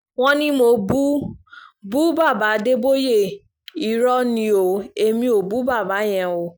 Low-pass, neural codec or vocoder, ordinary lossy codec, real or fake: none; none; none; real